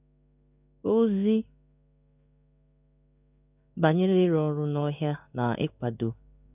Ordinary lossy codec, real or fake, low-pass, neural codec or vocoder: none; fake; 3.6 kHz; codec, 16 kHz in and 24 kHz out, 1 kbps, XY-Tokenizer